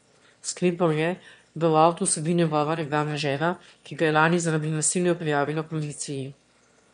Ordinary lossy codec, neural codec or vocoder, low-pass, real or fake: MP3, 64 kbps; autoencoder, 22.05 kHz, a latent of 192 numbers a frame, VITS, trained on one speaker; 9.9 kHz; fake